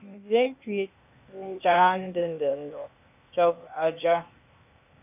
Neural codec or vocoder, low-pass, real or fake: codec, 16 kHz, 0.8 kbps, ZipCodec; 3.6 kHz; fake